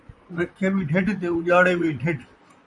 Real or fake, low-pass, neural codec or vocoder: fake; 10.8 kHz; vocoder, 44.1 kHz, 128 mel bands, Pupu-Vocoder